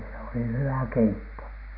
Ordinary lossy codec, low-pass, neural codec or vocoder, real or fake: none; 5.4 kHz; none; real